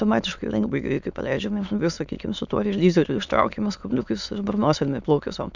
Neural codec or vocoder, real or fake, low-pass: autoencoder, 22.05 kHz, a latent of 192 numbers a frame, VITS, trained on many speakers; fake; 7.2 kHz